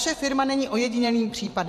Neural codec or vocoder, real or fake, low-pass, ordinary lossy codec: vocoder, 44.1 kHz, 128 mel bands every 512 samples, BigVGAN v2; fake; 14.4 kHz; MP3, 64 kbps